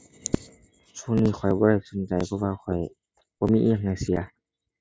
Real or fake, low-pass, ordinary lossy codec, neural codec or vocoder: real; none; none; none